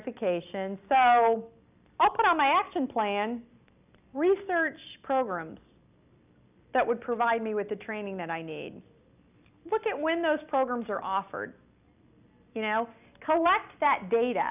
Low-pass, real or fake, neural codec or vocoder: 3.6 kHz; real; none